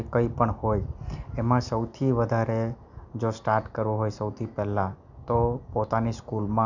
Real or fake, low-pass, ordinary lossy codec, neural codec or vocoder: real; 7.2 kHz; none; none